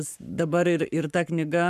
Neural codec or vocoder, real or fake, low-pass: codec, 44.1 kHz, 7.8 kbps, Pupu-Codec; fake; 14.4 kHz